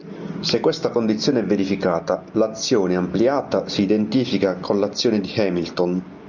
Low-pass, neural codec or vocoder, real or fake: 7.2 kHz; none; real